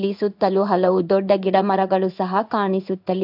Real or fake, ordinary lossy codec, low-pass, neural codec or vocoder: fake; AAC, 48 kbps; 5.4 kHz; codec, 16 kHz in and 24 kHz out, 1 kbps, XY-Tokenizer